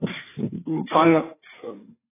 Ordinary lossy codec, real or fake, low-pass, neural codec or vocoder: AAC, 16 kbps; fake; 3.6 kHz; codec, 16 kHz, 4 kbps, FreqCodec, larger model